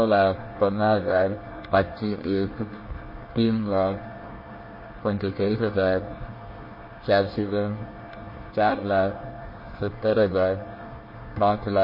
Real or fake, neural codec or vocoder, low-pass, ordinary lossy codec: fake; codec, 24 kHz, 1 kbps, SNAC; 5.4 kHz; MP3, 24 kbps